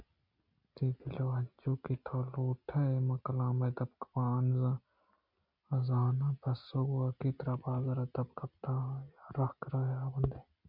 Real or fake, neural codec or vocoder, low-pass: real; none; 5.4 kHz